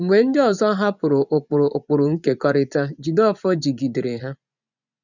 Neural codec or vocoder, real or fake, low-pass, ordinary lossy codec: vocoder, 24 kHz, 100 mel bands, Vocos; fake; 7.2 kHz; none